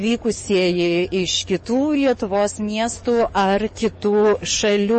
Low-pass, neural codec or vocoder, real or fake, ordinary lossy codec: 10.8 kHz; codec, 44.1 kHz, 7.8 kbps, DAC; fake; MP3, 32 kbps